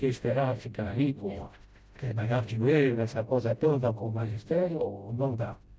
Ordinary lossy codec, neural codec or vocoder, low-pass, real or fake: none; codec, 16 kHz, 0.5 kbps, FreqCodec, smaller model; none; fake